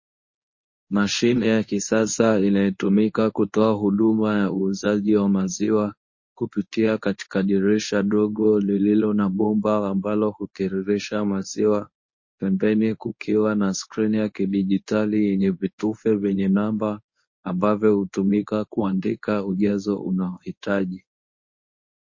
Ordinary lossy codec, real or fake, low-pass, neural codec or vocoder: MP3, 32 kbps; fake; 7.2 kHz; codec, 24 kHz, 0.9 kbps, WavTokenizer, medium speech release version 1